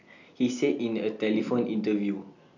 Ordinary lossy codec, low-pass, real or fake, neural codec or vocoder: none; 7.2 kHz; real; none